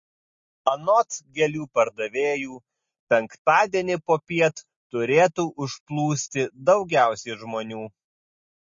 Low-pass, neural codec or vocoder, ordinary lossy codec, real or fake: 7.2 kHz; none; MP3, 32 kbps; real